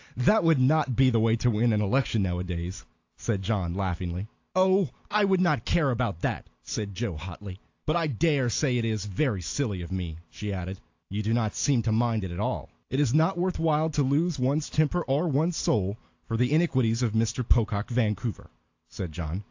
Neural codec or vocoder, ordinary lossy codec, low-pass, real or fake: none; AAC, 48 kbps; 7.2 kHz; real